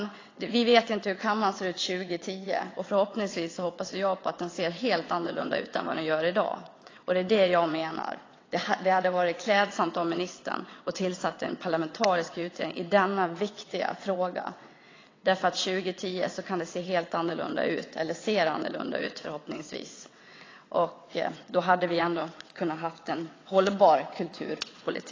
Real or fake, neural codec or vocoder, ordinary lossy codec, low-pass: fake; vocoder, 22.05 kHz, 80 mel bands, WaveNeXt; AAC, 32 kbps; 7.2 kHz